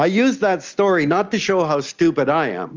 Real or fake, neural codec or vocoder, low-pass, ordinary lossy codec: real; none; 7.2 kHz; Opus, 32 kbps